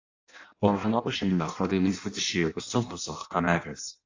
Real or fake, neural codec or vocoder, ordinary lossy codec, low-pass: fake; codec, 16 kHz in and 24 kHz out, 0.6 kbps, FireRedTTS-2 codec; AAC, 48 kbps; 7.2 kHz